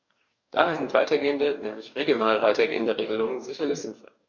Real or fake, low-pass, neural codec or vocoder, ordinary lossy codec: fake; 7.2 kHz; codec, 44.1 kHz, 2.6 kbps, DAC; none